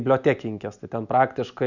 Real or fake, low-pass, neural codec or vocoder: real; 7.2 kHz; none